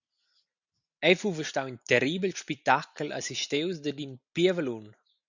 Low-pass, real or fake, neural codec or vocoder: 7.2 kHz; real; none